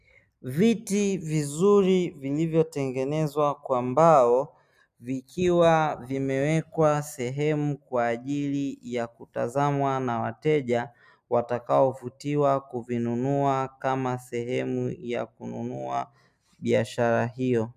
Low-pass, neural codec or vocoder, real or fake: 14.4 kHz; none; real